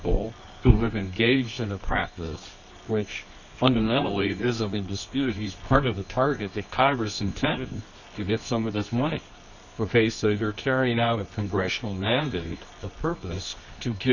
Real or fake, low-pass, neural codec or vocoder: fake; 7.2 kHz; codec, 24 kHz, 0.9 kbps, WavTokenizer, medium music audio release